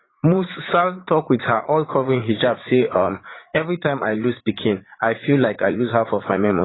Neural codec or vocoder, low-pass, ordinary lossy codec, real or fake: vocoder, 44.1 kHz, 80 mel bands, Vocos; 7.2 kHz; AAC, 16 kbps; fake